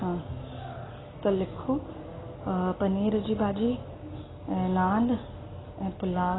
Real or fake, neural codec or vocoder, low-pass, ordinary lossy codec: real; none; 7.2 kHz; AAC, 16 kbps